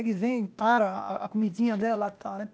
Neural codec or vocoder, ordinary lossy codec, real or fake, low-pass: codec, 16 kHz, 0.8 kbps, ZipCodec; none; fake; none